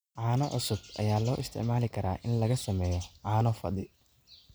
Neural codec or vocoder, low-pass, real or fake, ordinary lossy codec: none; none; real; none